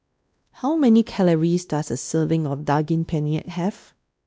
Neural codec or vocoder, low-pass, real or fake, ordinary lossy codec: codec, 16 kHz, 1 kbps, X-Codec, WavLM features, trained on Multilingual LibriSpeech; none; fake; none